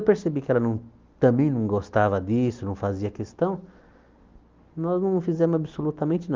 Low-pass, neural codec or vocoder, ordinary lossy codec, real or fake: 7.2 kHz; none; Opus, 32 kbps; real